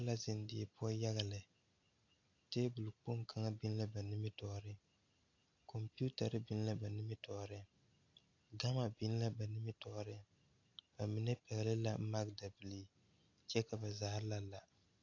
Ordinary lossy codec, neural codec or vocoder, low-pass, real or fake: AAC, 48 kbps; none; 7.2 kHz; real